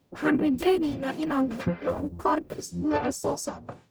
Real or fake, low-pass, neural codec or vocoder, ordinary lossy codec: fake; none; codec, 44.1 kHz, 0.9 kbps, DAC; none